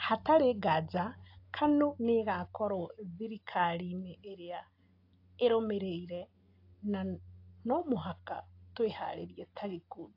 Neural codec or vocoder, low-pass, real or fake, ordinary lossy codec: none; 5.4 kHz; real; AAC, 32 kbps